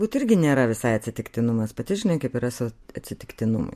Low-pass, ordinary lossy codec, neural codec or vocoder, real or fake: 14.4 kHz; MP3, 64 kbps; none; real